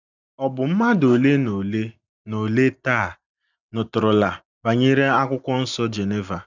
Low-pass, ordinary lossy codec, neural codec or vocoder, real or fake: 7.2 kHz; none; none; real